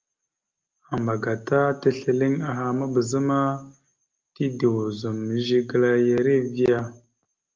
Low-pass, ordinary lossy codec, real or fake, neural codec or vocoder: 7.2 kHz; Opus, 24 kbps; real; none